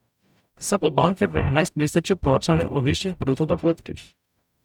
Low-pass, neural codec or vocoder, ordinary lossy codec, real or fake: 19.8 kHz; codec, 44.1 kHz, 0.9 kbps, DAC; none; fake